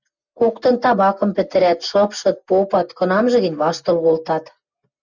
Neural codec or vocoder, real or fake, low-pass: none; real; 7.2 kHz